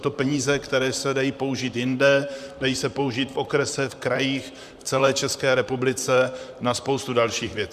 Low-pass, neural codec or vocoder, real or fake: 14.4 kHz; vocoder, 44.1 kHz, 128 mel bands, Pupu-Vocoder; fake